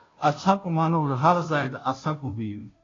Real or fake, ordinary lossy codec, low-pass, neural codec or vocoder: fake; AAC, 32 kbps; 7.2 kHz; codec, 16 kHz, 0.5 kbps, FunCodec, trained on Chinese and English, 25 frames a second